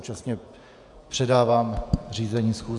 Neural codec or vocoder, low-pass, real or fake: vocoder, 48 kHz, 128 mel bands, Vocos; 10.8 kHz; fake